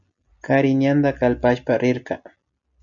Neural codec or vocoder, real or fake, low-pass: none; real; 7.2 kHz